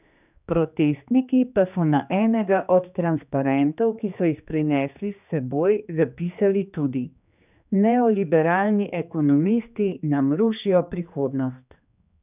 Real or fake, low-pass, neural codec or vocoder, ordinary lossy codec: fake; 3.6 kHz; codec, 16 kHz, 2 kbps, X-Codec, HuBERT features, trained on general audio; none